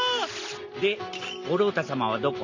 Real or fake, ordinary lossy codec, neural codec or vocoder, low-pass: real; AAC, 48 kbps; none; 7.2 kHz